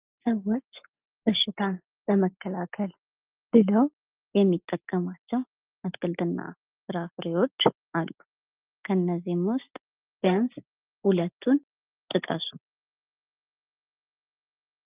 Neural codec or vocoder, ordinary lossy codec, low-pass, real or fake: none; Opus, 32 kbps; 3.6 kHz; real